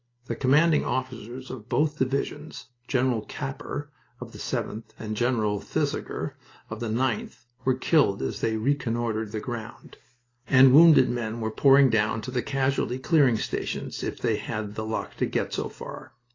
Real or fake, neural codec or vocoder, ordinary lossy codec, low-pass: real; none; AAC, 32 kbps; 7.2 kHz